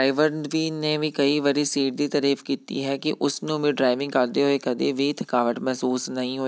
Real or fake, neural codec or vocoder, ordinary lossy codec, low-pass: real; none; none; none